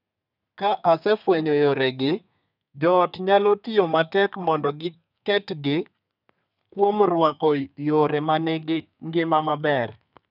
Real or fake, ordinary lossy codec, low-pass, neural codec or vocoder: fake; none; 5.4 kHz; codec, 32 kHz, 1.9 kbps, SNAC